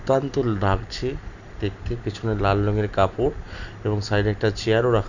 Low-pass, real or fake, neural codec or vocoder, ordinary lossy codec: 7.2 kHz; real; none; none